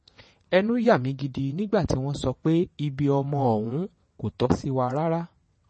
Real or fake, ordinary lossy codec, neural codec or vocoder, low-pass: fake; MP3, 32 kbps; vocoder, 22.05 kHz, 80 mel bands, WaveNeXt; 9.9 kHz